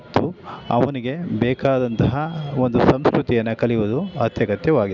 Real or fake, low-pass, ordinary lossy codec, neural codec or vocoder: real; 7.2 kHz; none; none